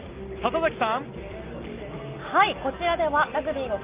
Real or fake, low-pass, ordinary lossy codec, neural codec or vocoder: real; 3.6 kHz; Opus, 32 kbps; none